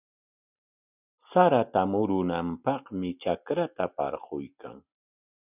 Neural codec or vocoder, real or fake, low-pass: none; real; 3.6 kHz